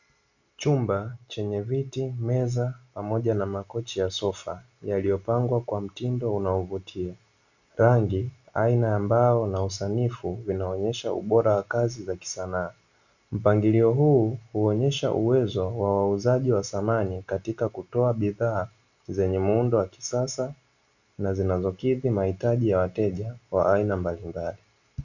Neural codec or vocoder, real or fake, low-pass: none; real; 7.2 kHz